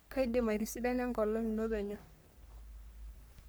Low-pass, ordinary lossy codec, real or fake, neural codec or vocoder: none; none; fake; codec, 44.1 kHz, 3.4 kbps, Pupu-Codec